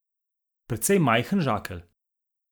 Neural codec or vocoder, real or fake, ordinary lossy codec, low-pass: none; real; none; none